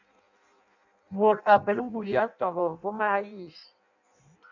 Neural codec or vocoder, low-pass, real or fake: codec, 16 kHz in and 24 kHz out, 0.6 kbps, FireRedTTS-2 codec; 7.2 kHz; fake